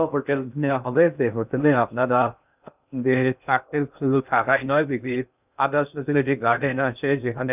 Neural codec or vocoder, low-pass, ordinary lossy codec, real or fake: codec, 16 kHz in and 24 kHz out, 0.6 kbps, FocalCodec, streaming, 2048 codes; 3.6 kHz; none; fake